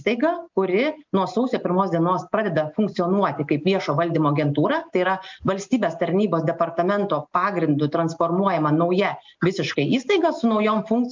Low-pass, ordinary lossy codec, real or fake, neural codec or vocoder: 7.2 kHz; MP3, 64 kbps; real; none